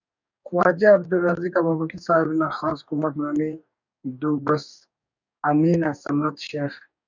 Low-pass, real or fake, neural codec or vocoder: 7.2 kHz; fake; codec, 44.1 kHz, 2.6 kbps, DAC